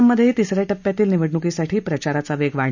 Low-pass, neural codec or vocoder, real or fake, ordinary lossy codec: 7.2 kHz; none; real; none